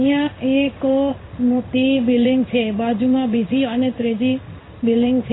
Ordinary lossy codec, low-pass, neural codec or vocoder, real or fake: AAC, 16 kbps; 7.2 kHz; codec, 16 kHz in and 24 kHz out, 1 kbps, XY-Tokenizer; fake